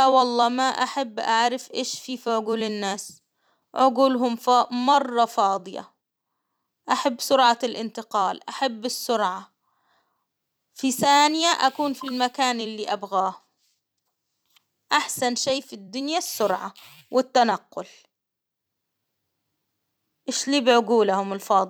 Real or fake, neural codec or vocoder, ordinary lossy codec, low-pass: fake; vocoder, 44.1 kHz, 128 mel bands every 256 samples, BigVGAN v2; none; none